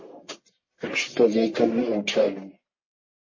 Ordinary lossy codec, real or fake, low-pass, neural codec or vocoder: MP3, 32 kbps; fake; 7.2 kHz; codec, 44.1 kHz, 1.7 kbps, Pupu-Codec